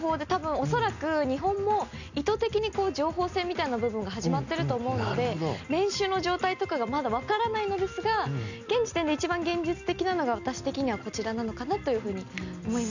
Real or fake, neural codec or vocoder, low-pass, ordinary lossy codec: real; none; 7.2 kHz; none